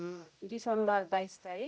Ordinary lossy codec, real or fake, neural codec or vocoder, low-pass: none; fake; codec, 16 kHz, 0.5 kbps, X-Codec, HuBERT features, trained on general audio; none